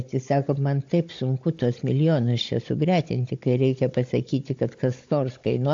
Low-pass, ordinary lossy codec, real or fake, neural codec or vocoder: 7.2 kHz; AAC, 48 kbps; fake; codec, 16 kHz, 16 kbps, FunCodec, trained on Chinese and English, 50 frames a second